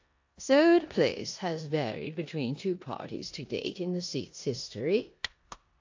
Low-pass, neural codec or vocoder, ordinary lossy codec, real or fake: 7.2 kHz; codec, 16 kHz in and 24 kHz out, 0.9 kbps, LongCat-Audio-Codec, four codebook decoder; AAC, 48 kbps; fake